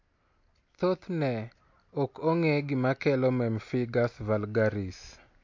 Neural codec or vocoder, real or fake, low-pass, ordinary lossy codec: none; real; 7.2 kHz; MP3, 48 kbps